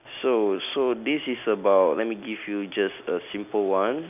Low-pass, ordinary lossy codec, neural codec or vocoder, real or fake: 3.6 kHz; none; none; real